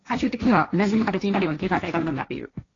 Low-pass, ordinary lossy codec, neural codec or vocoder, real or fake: 7.2 kHz; AAC, 32 kbps; codec, 16 kHz, 1.1 kbps, Voila-Tokenizer; fake